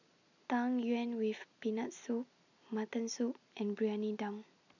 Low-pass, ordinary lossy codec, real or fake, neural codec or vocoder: 7.2 kHz; none; real; none